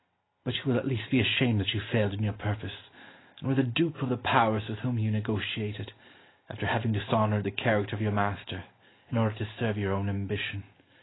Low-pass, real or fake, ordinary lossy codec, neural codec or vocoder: 7.2 kHz; real; AAC, 16 kbps; none